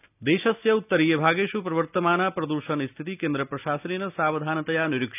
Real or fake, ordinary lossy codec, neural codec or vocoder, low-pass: real; none; none; 3.6 kHz